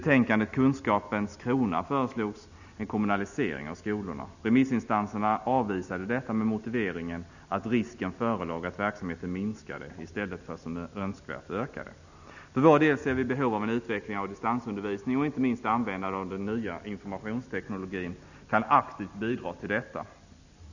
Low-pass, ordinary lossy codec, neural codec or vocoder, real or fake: 7.2 kHz; none; none; real